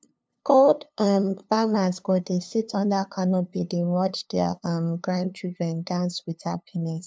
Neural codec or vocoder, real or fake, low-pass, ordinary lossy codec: codec, 16 kHz, 2 kbps, FunCodec, trained on LibriTTS, 25 frames a second; fake; none; none